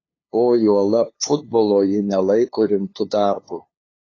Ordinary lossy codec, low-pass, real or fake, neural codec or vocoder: AAC, 32 kbps; 7.2 kHz; fake; codec, 16 kHz, 2 kbps, FunCodec, trained on LibriTTS, 25 frames a second